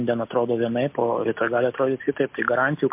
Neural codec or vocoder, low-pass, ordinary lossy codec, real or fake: none; 3.6 kHz; MP3, 32 kbps; real